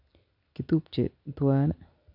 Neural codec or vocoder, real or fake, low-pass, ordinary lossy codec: none; real; 5.4 kHz; none